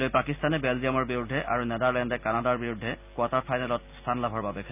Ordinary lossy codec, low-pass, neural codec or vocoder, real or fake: none; 3.6 kHz; none; real